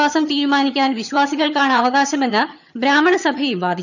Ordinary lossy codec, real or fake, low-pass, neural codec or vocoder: none; fake; 7.2 kHz; vocoder, 22.05 kHz, 80 mel bands, HiFi-GAN